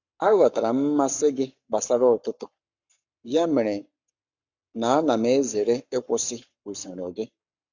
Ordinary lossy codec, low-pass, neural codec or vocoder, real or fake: Opus, 64 kbps; 7.2 kHz; codec, 44.1 kHz, 7.8 kbps, Pupu-Codec; fake